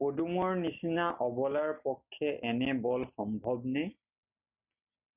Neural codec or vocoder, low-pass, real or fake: none; 3.6 kHz; real